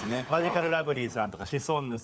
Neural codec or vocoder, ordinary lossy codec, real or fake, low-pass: codec, 16 kHz, 4 kbps, FunCodec, trained on LibriTTS, 50 frames a second; none; fake; none